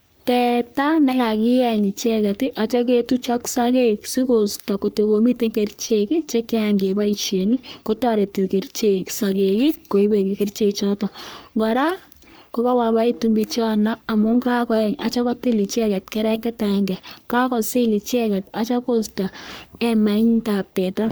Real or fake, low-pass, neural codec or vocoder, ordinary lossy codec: fake; none; codec, 44.1 kHz, 3.4 kbps, Pupu-Codec; none